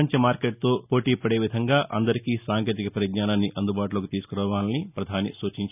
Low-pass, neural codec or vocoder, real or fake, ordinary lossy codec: 3.6 kHz; none; real; none